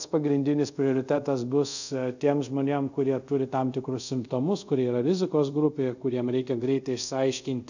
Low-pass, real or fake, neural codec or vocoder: 7.2 kHz; fake; codec, 24 kHz, 0.5 kbps, DualCodec